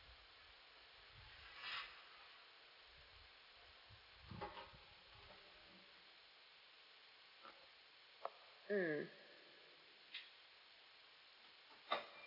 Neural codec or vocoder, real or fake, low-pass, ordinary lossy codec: none; real; 5.4 kHz; none